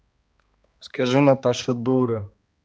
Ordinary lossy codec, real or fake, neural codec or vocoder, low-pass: none; fake; codec, 16 kHz, 2 kbps, X-Codec, HuBERT features, trained on balanced general audio; none